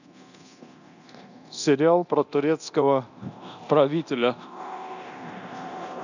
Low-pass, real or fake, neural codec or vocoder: 7.2 kHz; fake; codec, 24 kHz, 0.9 kbps, DualCodec